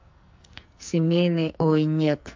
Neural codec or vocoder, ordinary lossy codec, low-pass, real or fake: codec, 44.1 kHz, 2.6 kbps, SNAC; MP3, 48 kbps; 7.2 kHz; fake